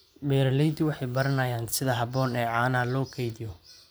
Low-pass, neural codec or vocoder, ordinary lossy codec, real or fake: none; vocoder, 44.1 kHz, 128 mel bands every 512 samples, BigVGAN v2; none; fake